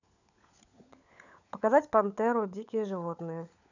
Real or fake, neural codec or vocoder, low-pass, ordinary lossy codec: fake; codec, 16 kHz, 16 kbps, FunCodec, trained on LibriTTS, 50 frames a second; 7.2 kHz; none